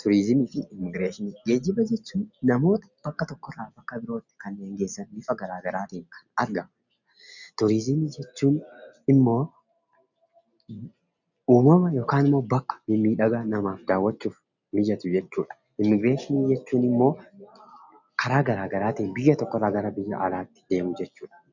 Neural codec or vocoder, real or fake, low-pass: none; real; 7.2 kHz